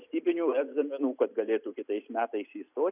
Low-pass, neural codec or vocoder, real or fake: 3.6 kHz; none; real